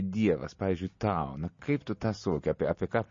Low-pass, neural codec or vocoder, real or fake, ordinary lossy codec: 7.2 kHz; none; real; MP3, 32 kbps